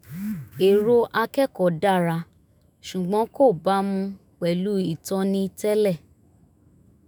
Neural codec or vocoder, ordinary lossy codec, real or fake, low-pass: autoencoder, 48 kHz, 128 numbers a frame, DAC-VAE, trained on Japanese speech; none; fake; none